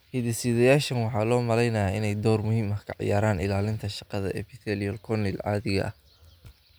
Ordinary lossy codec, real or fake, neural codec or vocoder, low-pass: none; real; none; none